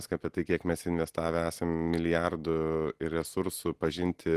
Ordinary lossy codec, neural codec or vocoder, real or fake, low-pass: Opus, 16 kbps; none; real; 14.4 kHz